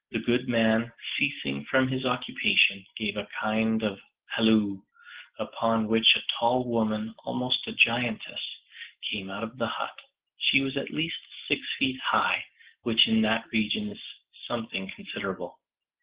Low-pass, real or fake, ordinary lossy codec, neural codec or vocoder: 3.6 kHz; real; Opus, 16 kbps; none